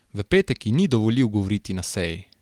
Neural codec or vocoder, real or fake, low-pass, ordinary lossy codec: none; real; 19.8 kHz; Opus, 24 kbps